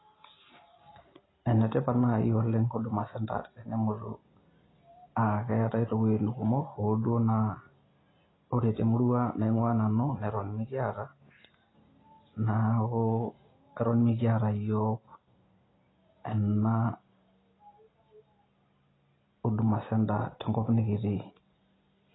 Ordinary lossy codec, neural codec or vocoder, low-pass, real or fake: AAC, 16 kbps; none; 7.2 kHz; real